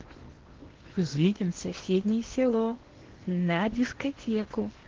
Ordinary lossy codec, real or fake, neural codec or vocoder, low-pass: Opus, 16 kbps; fake; codec, 16 kHz in and 24 kHz out, 0.8 kbps, FocalCodec, streaming, 65536 codes; 7.2 kHz